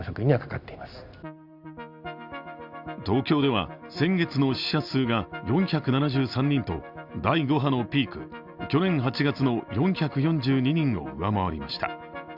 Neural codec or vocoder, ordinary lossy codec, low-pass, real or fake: none; Opus, 64 kbps; 5.4 kHz; real